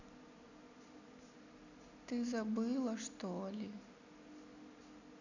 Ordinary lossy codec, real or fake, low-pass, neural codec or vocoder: none; real; 7.2 kHz; none